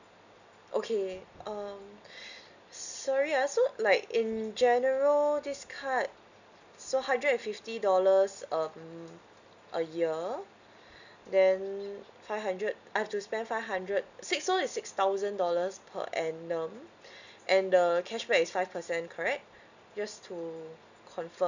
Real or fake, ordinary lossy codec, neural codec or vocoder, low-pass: real; none; none; 7.2 kHz